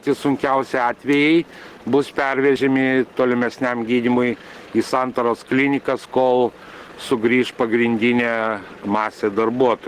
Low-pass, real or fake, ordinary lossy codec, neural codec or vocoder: 14.4 kHz; real; Opus, 16 kbps; none